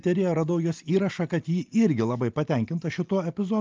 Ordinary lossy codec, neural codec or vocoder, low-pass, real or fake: Opus, 24 kbps; none; 7.2 kHz; real